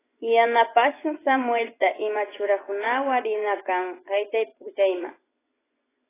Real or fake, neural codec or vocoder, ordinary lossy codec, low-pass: fake; vocoder, 24 kHz, 100 mel bands, Vocos; AAC, 16 kbps; 3.6 kHz